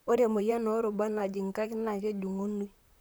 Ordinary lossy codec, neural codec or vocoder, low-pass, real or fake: none; vocoder, 44.1 kHz, 128 mel bands, Pupu-Vocoder; none; fake